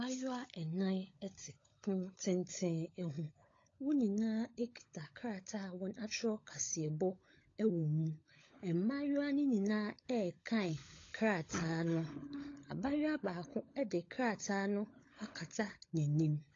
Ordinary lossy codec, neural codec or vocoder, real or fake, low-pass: AAC, 32 kbps; codec, 16 kHz, 16 kbps, FunCodec, trained on LibriTTS, 50 frames a second; fake; 7.2 kHz